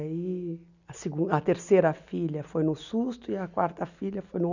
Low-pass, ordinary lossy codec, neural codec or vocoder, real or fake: 7.2 kHz; none; none; real